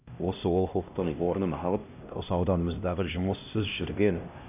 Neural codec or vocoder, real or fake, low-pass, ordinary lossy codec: codec, 16 kHz, 1 kbps, X-Codec, HuBERT features, trained on LibriSpeech; fake; 3.6 kHz; none